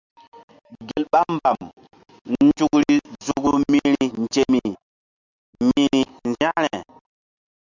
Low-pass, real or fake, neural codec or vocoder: 7.2 kHz; real; none